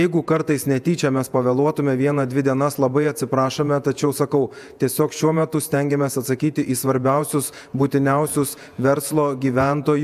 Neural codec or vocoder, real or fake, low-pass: vocoder, 48 kHz, 128 mel bands, Vocos; fake; 14.4 kHz